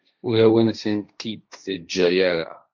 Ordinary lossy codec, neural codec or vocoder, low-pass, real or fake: MP3, 48 kbps; codec, 16 kHz, 1.1 kbps, Voila-Tokenizer; 7.2 kHz; fake